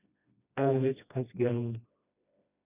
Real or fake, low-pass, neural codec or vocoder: fake; 3.6 kHz; codec, 16 kHz, 1 kbps, FreqCodec, smaller model